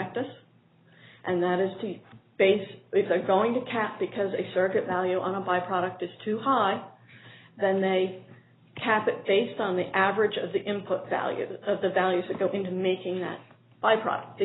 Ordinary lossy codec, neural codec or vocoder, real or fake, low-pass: AAC, 16 kbps; none; real; 7.2 kHz